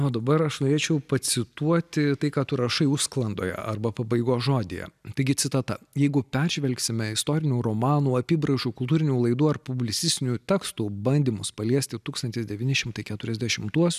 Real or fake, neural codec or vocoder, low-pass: real; none; 14.4 kHz